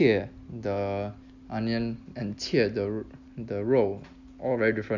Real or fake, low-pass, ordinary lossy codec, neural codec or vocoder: real; 7.2 kHz; Opus, 64 kbps; none